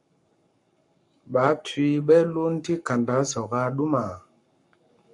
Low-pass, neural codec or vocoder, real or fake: 10.8 kHz; codec, 44.1 kHz, 7.8 kbps, Pupu-Codec; fake